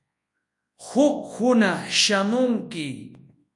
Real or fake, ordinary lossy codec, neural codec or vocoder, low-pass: fake; MP3, 48 kbps; codec, 24 kHz, 0.9 kbps, WavTokenizer, large speech release; 10.8 kHz